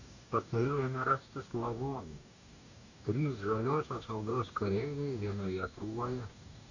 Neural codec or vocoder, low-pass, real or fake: codec, 44.1 kHz, 2.6 kbps, DAC; 7.2 kHz; fake